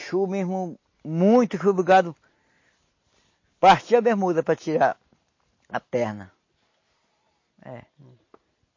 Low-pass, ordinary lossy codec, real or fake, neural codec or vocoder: 7.2 kHz; MP3, 32 kbps; real; none